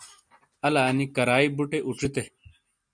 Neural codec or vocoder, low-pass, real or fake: none; 9.9 kHz; real